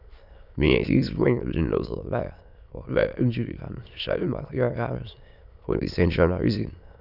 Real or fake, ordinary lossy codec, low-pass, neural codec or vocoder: fake; none; 5.4 kHz; autoencoder, 22.05 kHz, a latent of 192 numbers a frame, VITS, trained on many speakers